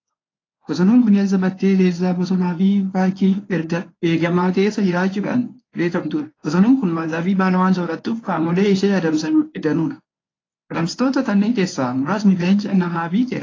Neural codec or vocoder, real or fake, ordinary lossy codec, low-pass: codec, 24 kHz, 0.9 kbps, WavTokenizer, medium speech release version 1; fake; AAC, 32 kbps; 7.2 kHz